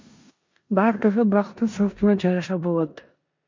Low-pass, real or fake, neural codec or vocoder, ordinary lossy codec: 7.2 kHz; fake; codec, 16 kHz in and 24 kHz out, 0.9 kbps, LongCat-Audio-Codec, four codebook decoder; MP3, 48 kbps